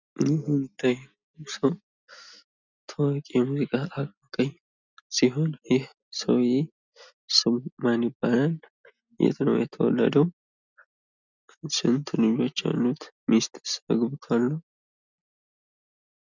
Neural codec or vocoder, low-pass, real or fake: none; 7.2 kHz; real